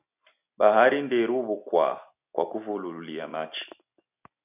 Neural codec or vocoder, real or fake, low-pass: none; real; 3.6 kHz